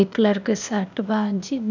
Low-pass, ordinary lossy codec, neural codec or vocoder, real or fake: 7.2 kHz; none; codec, 16 kHz, 0.8 kbps, ZipCodec; fake